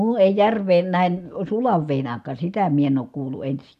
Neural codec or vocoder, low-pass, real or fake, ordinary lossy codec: none; 14.4 kHz; real; none